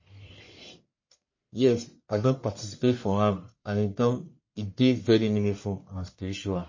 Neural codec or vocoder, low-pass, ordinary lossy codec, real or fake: codec, 44.1 kHz, 1.7 kbps, Pupu-Codec; 7.2 kHz; MP3, 32 kbps; fake